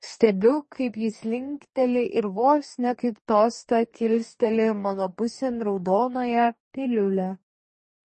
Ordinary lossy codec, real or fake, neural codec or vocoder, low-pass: MP3, 32 kbps; fake; codec, 44.1 kHz, 2.6 kbps, DAC; 10.8 kHz